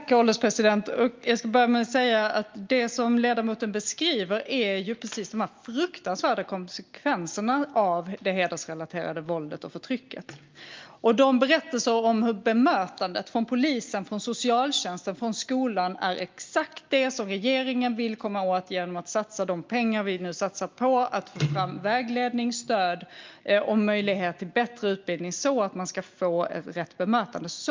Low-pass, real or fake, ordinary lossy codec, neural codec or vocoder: 7.2 kHz; real; Opus, 32 kbps; none